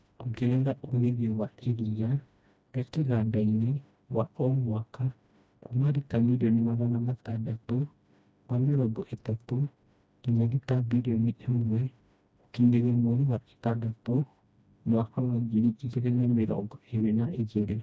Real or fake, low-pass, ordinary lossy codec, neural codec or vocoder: fake; none; none; codec, 16 kHz, 1 kbps, FreqCodec, smaller model